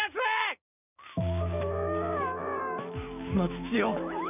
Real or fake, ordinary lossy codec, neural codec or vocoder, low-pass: fake; none; codec, 16 kHz, 6 kbps, DAC; 3.6 kHz